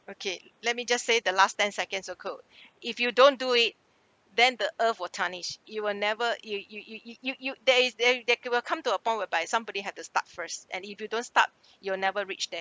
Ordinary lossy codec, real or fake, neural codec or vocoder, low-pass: none; real; none; none